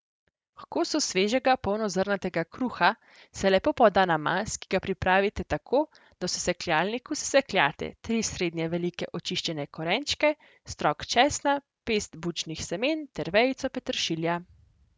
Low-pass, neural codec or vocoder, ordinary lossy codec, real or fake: none; none; none; real